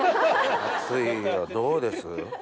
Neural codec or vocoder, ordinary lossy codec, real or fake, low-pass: none; none; real; none